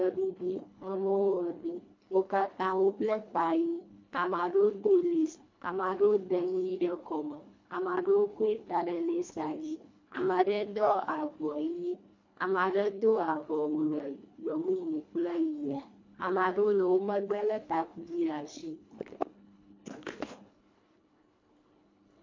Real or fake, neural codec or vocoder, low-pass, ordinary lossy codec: fake; codec, 24 kHz, 1.5 kbps, HILCodec; 7.2 kHz; MP3, 48 kbps